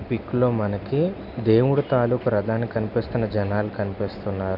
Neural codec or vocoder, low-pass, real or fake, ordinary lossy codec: none; 5.4 kHz; real; MP3, 48 kbps